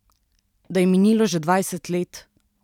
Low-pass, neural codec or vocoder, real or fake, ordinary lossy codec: 19.8 kHz; vocoder, 44.1 kHz, 128 mel bands every 512 samples, BigVGAN v2; fake; none